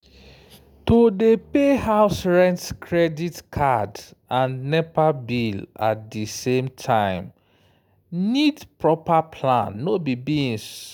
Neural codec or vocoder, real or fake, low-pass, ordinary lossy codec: none; real; none; none